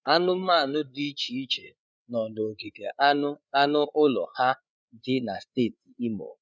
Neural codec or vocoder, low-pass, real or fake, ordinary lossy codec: codec, 16 kHz, 8 kbps, FreqCodec, larger model; none; fake; none